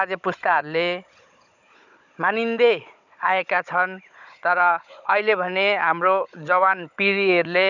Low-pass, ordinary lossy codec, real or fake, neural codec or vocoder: 7.2 kHz; none; fake; codec, 16 kHz, 16 kbps, FunCodec, trained on Chinese and English, 50 frames a second